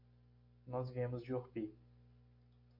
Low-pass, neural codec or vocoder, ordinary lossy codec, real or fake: 5.4 kHz; none; MP3, 32 kbps; real